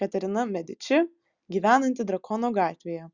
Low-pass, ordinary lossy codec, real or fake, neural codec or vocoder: 7.2 kHz; Opus, 64 kbps; real; none